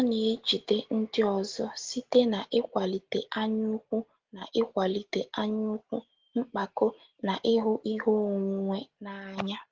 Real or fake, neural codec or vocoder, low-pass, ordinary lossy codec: real; none; 7.2 kHz; Opus, 16 kbps